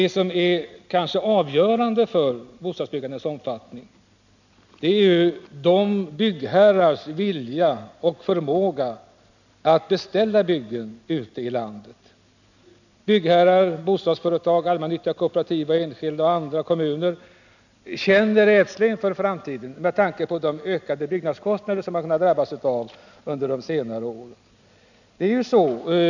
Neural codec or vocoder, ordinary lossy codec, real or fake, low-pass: none; none; real; 7.2 kHz